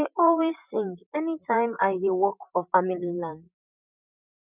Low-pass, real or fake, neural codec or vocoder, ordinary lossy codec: 3.6 kHz; fake; vocoder, 44.1 kHz, 128 mel bands, Pupu-Vocoder; none